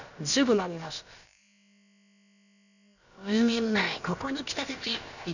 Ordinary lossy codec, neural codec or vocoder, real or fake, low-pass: none; codec, 16 kHz, about 1 kbps, DyCAST, with the encoder's durations; fake; 7.2 kHz